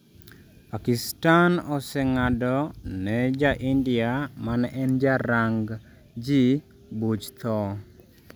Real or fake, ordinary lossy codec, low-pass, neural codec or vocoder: real; none; none; none